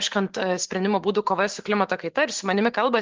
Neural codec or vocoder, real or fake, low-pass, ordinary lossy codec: vocoder, 44.1 kHz, 128 mel bands every 512 samples, BigVGAN v2; fake; 7.2 kHz; Opus, 16 kbps